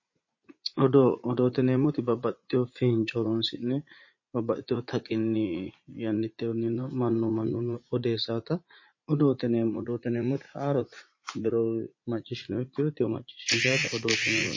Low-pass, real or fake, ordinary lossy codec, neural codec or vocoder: 7.2 kHz; fake; MP3, 32 kbps; vocoder, 44.1 kHz, 80 mel bands, Vocos